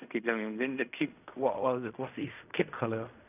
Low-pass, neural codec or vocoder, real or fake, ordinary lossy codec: 3.6 kHz; codec, 16 kHz in and 24 kHz out, 0.4 kbps, LongCat-Audio-Codec, fine tuned four codebook decoder; fake; none